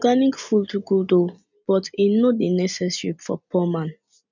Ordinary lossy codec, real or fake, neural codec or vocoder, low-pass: none; real; none; 7.2 kHz